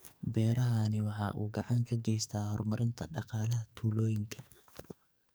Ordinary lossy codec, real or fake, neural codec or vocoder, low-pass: none; fake; codec, 44.1 kHz, 2.6 kbps, SNAC; none